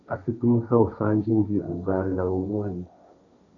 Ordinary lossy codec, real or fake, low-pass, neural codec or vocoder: AAC, 64 kbps; fake; 7.2 kHz; codec, 16 kHz, 1.1 kbps, Voila-Tokenizer